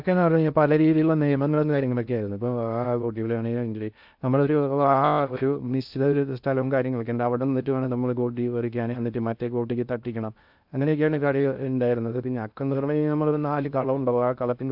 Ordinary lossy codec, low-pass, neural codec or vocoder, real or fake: AAC, 48 kbps; 5.4 kHz; codec, 16 kHz in and 24 kHz out, 0.6 kbps, FocalCodec, streaming, 2048 codes; fake